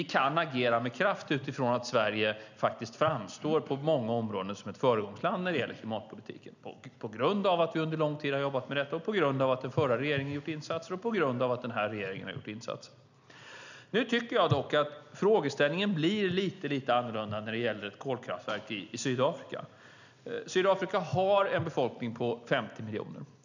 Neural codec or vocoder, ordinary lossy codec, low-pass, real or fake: none; none; 7.2 kHz; real